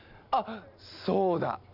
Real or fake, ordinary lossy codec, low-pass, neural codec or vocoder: real; none; 5.4 kHz; none